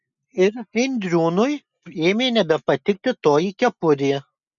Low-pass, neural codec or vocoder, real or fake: 7.2 kHz; none; real